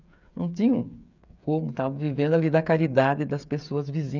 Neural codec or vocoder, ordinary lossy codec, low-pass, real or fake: codec, 16 kHz, 8 kbps, FreqCodec, smaller model; none; 7.2 kHz; fake